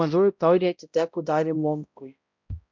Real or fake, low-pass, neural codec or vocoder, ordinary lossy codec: fake; 7.2 kHz; codec, 16 kHz, 0.5 kbps, X-Codec, HuBERT features, trained on balanced general audio; MP3, 64 kbps